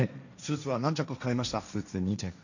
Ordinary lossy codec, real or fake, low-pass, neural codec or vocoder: none; fake; 7.2 kHz; codec, 16 kHz, 1.1 kbps, Voila-Tokenizer